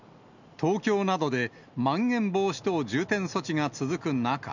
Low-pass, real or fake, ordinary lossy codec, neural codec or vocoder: 7.2 kHz; real; none; none